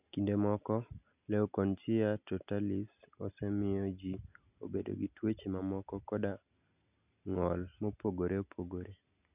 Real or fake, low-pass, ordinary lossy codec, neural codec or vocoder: real; 3.6 kHz; none; none